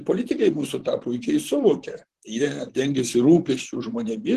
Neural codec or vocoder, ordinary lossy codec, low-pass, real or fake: vocoder, 44.1 kHz, 128 mel bands, Pupu-Vocoder; Opus, 16 kbps; 14.4 kHz; fake